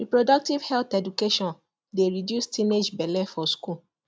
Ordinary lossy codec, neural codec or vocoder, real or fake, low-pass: none; none; real; none